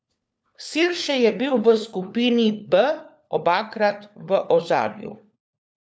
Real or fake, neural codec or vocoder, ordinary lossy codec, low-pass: fake; codec, 16 kHz, 4 kbps, FunCodec, trained on LibriTTS, 50 frames a second; none; none